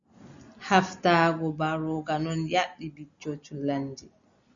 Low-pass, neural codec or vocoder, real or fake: 7.2 kHz; none; real